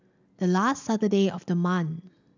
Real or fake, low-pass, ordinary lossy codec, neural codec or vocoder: real; 7.2 kHz; none; none